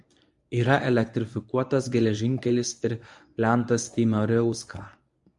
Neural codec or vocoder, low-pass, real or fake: codec, 24 kHz, 0.9 kbps, WavTokenizer, medium speech release version 1; 10.8 kHz; fake